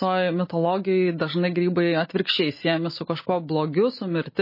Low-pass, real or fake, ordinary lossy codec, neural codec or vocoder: 5.4 kHz; real; MP3, 24 kbps; none